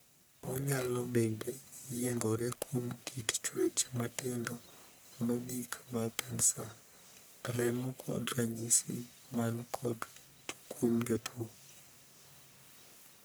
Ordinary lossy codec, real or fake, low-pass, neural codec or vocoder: none; fake; none; codec, 44.1 kHz, 1.7 kbps, Pupu-Codec